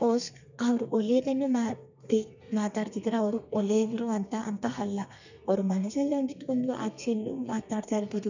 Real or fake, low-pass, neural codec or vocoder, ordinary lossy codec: fake; 7.2 kHz; codec, 32 kHz, 1.9 kbps, SNAC; none